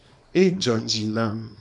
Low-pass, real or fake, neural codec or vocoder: 10.8 kHz; fake; codec, 24 kHz, 0.9 kbps, WavTokenizer, small release